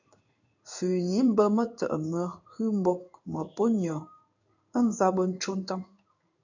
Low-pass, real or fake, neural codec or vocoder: 7.2 kHz; fake; codec, 16 kHz in and 24 kHz out, 1 kbps, XY-Tokenizer